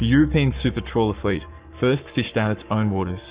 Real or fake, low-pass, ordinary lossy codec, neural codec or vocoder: fake; 3.6 kHz; Opus, 64 kbps; codec, 44.1 kHz, 7.8 kbps, Pupu-Codec